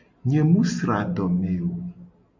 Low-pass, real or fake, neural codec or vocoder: 7.2 kHz; real; none